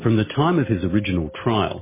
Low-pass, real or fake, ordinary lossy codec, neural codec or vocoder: 3.6 kHz; real; MP3, 16 kbps; none